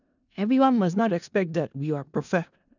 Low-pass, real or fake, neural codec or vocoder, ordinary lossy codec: 7.2 kHz; fake; codec, 16 kHz in and 24 kHz out, 0.4 kbps, LongCat-Audio-Codec, four codebook decoder; none